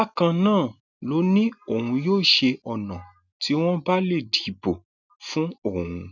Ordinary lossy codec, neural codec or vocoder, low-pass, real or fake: none; none; 7.2 kHz; real